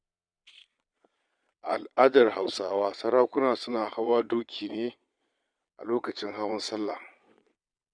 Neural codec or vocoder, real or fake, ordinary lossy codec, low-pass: vocoder, 22.05 kHz, 80 mel bands, Vocos; fake; none; 9.9 kHz